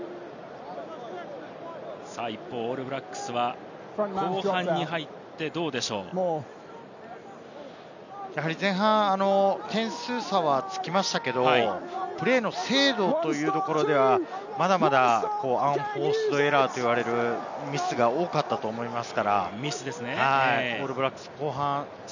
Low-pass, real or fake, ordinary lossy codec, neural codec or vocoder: 7.2 kHz; real; none; none